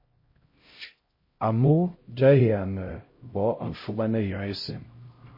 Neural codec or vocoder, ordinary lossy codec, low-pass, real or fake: codec, 16 kHz, 0.5 kbps, X-Codec, HuBERT features, trained on LibriSpeech; MP3, 24 kbps; 5.4 kHz; fake